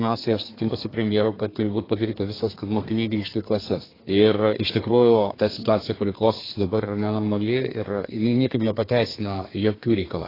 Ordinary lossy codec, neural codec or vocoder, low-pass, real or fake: AAC, 24 kbps; codec, 32 kHz, 1.9 kbps, SNAC; 5.4 kHz; fake